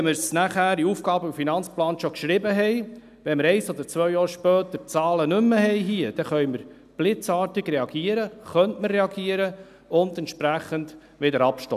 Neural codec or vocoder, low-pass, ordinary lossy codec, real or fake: none; 14.4 kHz; none; real